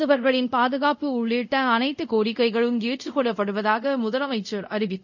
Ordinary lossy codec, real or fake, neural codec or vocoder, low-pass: none; fake; codec, 24 kHz, 0.5 kbps, DualCodec; 7.2 kHz